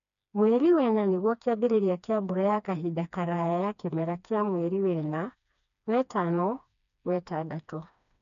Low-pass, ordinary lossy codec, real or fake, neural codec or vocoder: 7.2 kHz; none; fake; codec, 16 kHz, 2 kbps, FreqCodec, smaller model